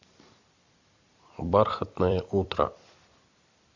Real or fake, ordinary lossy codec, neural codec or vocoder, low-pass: fake; AAC, 48 kbps; vocoder, 44.1 kHz, 128 mel bands every 256 samples, BigVGAN v2; 7.2 kHz